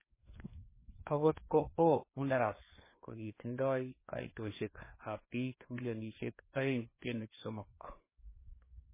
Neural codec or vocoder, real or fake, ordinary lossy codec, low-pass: codec, 16 kHz, 2 kbps, FreqCodec, larger model; fake; MP3, 16 kbps; 3.6 kHz